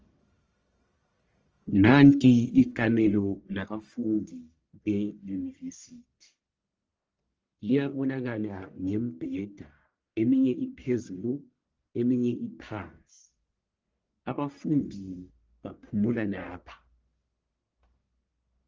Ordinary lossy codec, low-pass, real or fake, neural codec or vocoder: Opus, 24 kbps; 7.2 kHz; fake; codec, 44.1 kHz, 1.7 kbps, Pupu-Codec